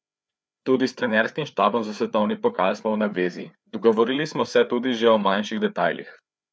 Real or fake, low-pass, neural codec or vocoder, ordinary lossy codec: fake; none; codec, 16 kHz, 4 kbps, FreqCodec, larger model; none